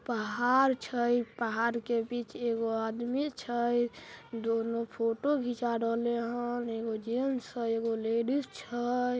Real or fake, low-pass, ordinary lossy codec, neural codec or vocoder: real; none; none; none